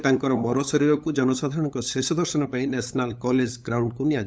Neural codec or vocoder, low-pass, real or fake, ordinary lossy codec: codec, 16 kHz, 8 kbps, FunCodec, trained on LibriTTS, 25 frames a second; none; fake; none